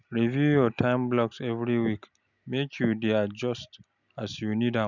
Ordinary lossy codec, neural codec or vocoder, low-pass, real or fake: none; none; 7.2 kHz; real